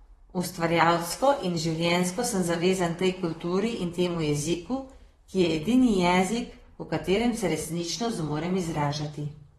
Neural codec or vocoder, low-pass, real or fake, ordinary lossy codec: vocoder, 44.1 kHz, 128 mel bands, Pupu-Vocoder; 19.8 kHz; fake; AAC, 32 kbps